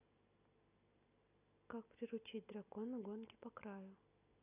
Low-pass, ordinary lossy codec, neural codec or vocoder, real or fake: 3.6 kHz; none; none; real